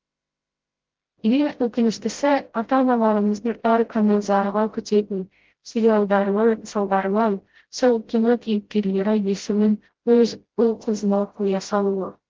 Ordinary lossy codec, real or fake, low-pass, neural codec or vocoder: Opus, 16 kbps; fake; 7.2 kHz; codec, 16 kHz, 0.5 kbps, FreqCodec, smaller model